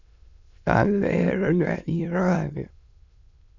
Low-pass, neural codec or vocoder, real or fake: 7.2 kHz; autoencoder, 22.05 kHz, a latent of 192 numbers a frame, VITS, trained on many speakers; fake